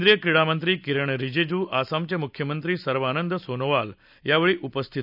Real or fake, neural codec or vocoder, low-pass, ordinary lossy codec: real; none; 5.4 kHz; none